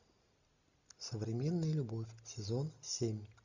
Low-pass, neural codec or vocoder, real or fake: 7.2 kHz; none; real